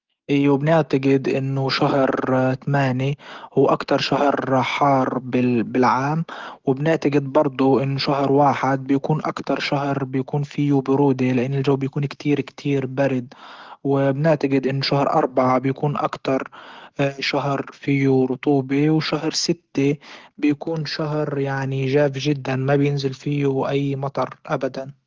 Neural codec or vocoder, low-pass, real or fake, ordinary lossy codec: autoencoder, 48 kHz, 128 numbers a frame, DAC-VAE, trained on Japanese speech; 7.2 kHz; fake; Opus, 16 kbps